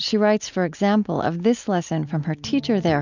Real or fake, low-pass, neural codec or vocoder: real; 7.2 kHz; none